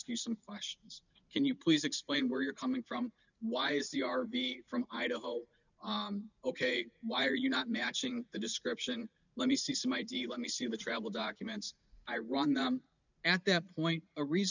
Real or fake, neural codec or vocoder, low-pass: fake; vocoder, 44.1 kHz, 80 mel bands, Vocos; 7.2 kHz